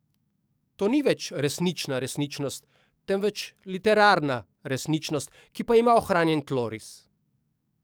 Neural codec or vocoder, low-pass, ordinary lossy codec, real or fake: codec, 44.1 kHz, 7.8 kbps, DAC; none; none; fake